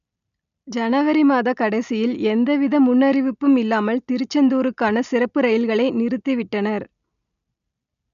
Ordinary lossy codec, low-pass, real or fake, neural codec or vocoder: none; 7.2 kHz; real; none